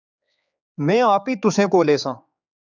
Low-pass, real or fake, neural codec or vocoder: 7.2 kHz; fake; codec, 16 kHz, 4 kbps, X-Codec, HuBERT features, trained on general audio